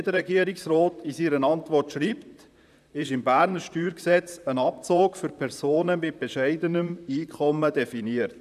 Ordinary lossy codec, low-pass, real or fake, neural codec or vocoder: none; 14.4 kHz; fake; vocoder, 44.1 kHz, 128 mel bands, Pupu-Vocoder